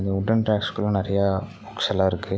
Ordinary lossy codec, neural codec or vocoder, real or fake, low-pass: none; none; real; none